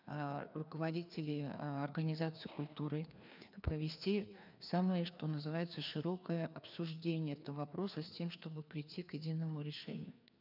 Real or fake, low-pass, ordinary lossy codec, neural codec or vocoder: fake; 5.4 kHz; none; codec, 16 kHz, 2 kbps, FreqCodec, larger model